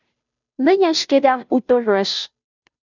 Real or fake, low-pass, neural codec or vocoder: fake; 7.2 kHz; codec, 16 kHz, 0.5 kbps, FunCodec, trained on Chinese and English, 25 frames a second